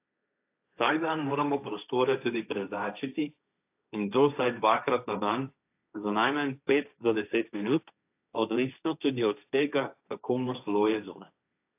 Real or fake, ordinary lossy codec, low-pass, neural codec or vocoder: fake; AAC, 32 kbps; 3.6 kHz; codec, 16 kHz, 1.1 kbps, Voila-Tokenizer